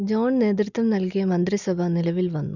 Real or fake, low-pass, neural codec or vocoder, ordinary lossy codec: real; 7.2 kHz; none; none